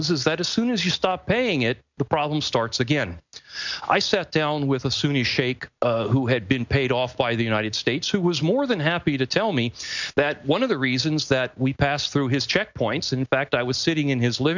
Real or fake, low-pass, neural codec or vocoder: real; 7.2 kHz; none